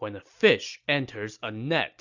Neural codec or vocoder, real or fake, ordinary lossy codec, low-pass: none; real; Opus, 64 kbps; 7.2 kHz